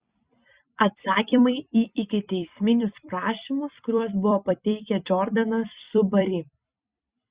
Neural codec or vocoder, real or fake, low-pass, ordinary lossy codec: vocoder, 44.1 kHz, 128 mel bands every 256 samples, BigVGAN v2; fake; 3.6 kHz; Opus, 64 kbps